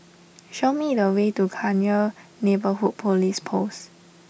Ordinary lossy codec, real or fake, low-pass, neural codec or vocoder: none; real; none; none